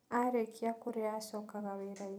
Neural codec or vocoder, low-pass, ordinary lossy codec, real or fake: vocoder, 44.1 kHz, 128 mel bands every 256 samples, BigVGAN v2; none; none; fake